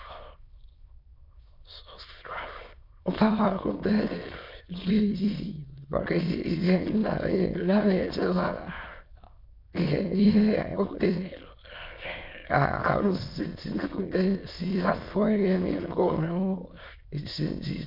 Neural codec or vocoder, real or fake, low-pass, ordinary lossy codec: autoencoder, 22.05 kHz, a latent of 192 numbers a frame, VITS, trained on many speakers; fake; 5.4 kHz; MP3, 48 kbps